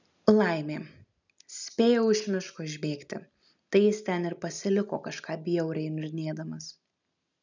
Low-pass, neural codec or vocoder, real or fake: 7.2 kHz; none; real